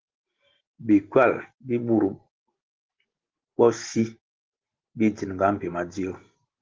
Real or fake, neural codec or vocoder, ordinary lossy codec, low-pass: real; none; Opus, 16 kbps; 7.2 kHz